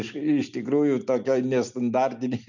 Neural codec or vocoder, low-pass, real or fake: none; 7.2 kHz; real